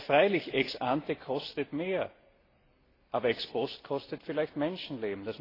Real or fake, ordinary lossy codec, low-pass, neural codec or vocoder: real; AAC, 24 kbps; 5.4 kHz; none